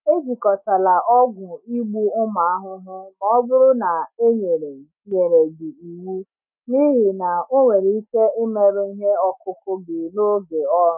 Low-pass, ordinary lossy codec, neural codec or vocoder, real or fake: 3.6 kHz; none; none; real